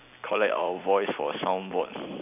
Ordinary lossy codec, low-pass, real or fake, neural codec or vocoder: none; 3.6 kHz; real; none